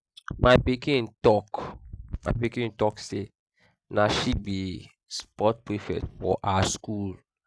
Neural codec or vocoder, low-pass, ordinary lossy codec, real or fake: none; none; none; real